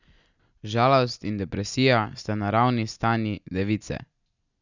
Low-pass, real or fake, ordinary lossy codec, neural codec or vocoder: 7.2 kHz; real; none; none